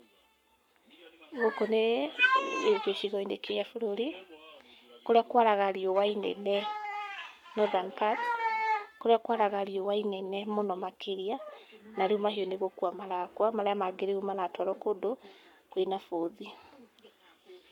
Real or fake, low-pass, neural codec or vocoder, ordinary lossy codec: fake; 19.8 kHz; codec, 44.1 kHz, 7.8 kbps, Pupu-Codec; none